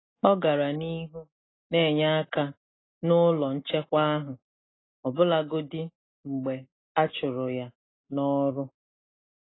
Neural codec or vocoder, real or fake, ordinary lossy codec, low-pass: none; real; AAC, 16 kbps; 7.2 kHz